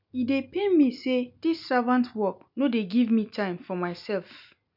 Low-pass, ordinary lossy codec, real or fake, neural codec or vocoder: 5.4 kHz; none; real; none